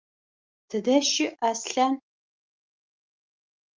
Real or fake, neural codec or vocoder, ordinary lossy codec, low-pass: real; none; Opus, 32 kbps; 7.2 kHz